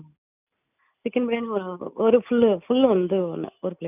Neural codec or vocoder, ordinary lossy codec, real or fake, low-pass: none; none; real; 3.6 kHz